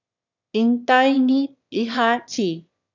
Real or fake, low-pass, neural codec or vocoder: fake; 7.2 kHz; autoencoder, 22.05 kHz, a latent of 192 numbers a frame, VITS, trained on one speaker